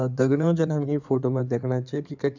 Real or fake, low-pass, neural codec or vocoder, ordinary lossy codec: fake; 7.2 kHz; codec, 16 kHz in and 24 kHz out, 2.2 kbps, FireRedTTS-2 codec; none